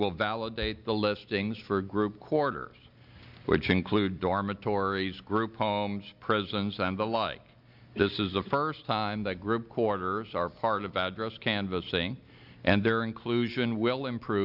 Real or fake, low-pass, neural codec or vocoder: real; 5.4 kHz; none